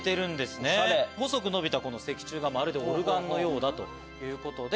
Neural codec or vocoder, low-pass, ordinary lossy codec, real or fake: none; none; none; real